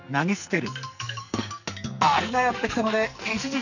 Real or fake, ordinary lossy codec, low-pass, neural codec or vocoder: fake; none; 7.2 kHz; codec, 44.1 kHz, 2.6 kbps, SNAC